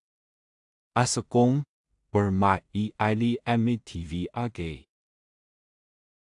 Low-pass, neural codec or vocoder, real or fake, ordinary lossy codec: 10.8 kHz; codec, 16 kHz in and 24 kHz out, 0.4 kbps, LongCat-Audio-Codec, two codebook decoder; fake; AAC, 64 kbps